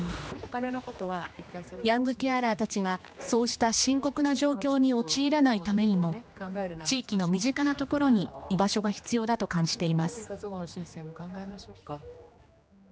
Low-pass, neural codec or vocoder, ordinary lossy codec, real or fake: none; codec, 16 kHz, 2 kbps, X-Codec, HuBERT features, trained on general audio; none; fake